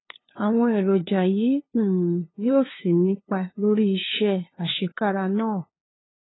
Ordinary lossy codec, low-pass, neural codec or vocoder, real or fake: AAC, 16 kbps; 7.2 kHz; codec, 16 kHz, 2 kbps, FunCodec, trained on LibriTTS, 25 frames a second; fake